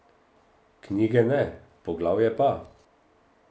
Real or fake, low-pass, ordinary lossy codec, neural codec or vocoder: real; none; none; none